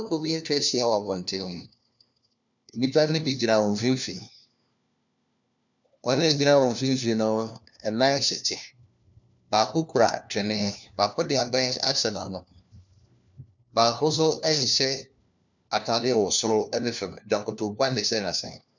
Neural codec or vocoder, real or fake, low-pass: codec, 16 kHz, 1 kbps, FunCodec, trained on LibriTTS, 50 frames a second; fake; 7.2 kHz